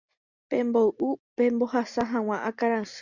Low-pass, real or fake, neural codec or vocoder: 7.2 kHz; real; none